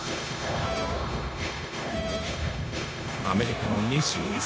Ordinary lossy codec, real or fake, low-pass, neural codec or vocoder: none; fake; none; codec, 16 kHz, 0.9 kbps, LongCat-Audio-Codec